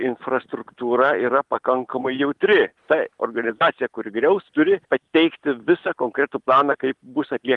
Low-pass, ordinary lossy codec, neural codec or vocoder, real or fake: 10.8 kHz; Opus, 24 kbps; none; real